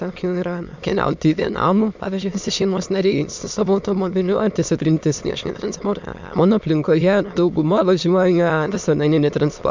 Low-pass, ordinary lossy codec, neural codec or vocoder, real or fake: 7.2 kHz; MP3, 64 kbps; autoencoder, 22.05 kHz, a latent of 192 numbers a frame, VITS, trained on many speakers; fake